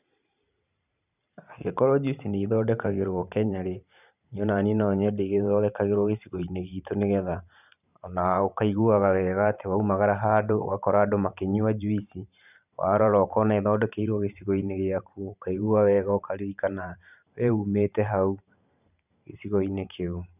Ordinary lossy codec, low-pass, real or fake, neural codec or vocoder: none; 3.6 kHz; fake; vocoder, 44.1 kHz, 128 mel bands every 512 samples, BigVGAN v2